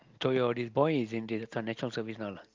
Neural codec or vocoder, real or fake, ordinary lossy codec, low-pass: none; real; Opus, 32 kbps; 7.2 kHz